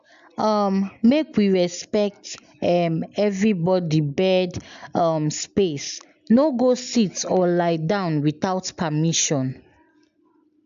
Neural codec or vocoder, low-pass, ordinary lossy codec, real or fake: none; 7.2 kHz; AAC, 96 kbps; real